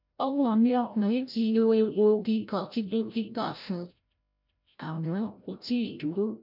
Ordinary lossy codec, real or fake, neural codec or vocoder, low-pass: none; fake; codec, 16 kHz, 0.5 kbps, FreqCodec, larger model; 5.4 kHz